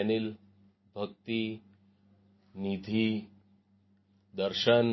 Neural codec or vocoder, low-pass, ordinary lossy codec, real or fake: none; 7.2 kHz; MP3, 24 kbps; real